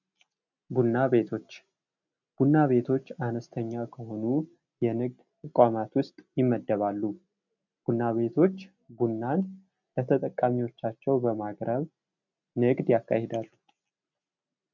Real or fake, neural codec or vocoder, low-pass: real; none; 7.2 kHz